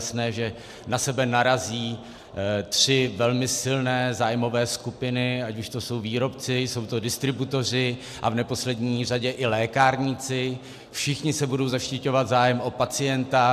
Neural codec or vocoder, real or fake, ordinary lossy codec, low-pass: none; real; AAC, 96 kbps; 14.4 kHz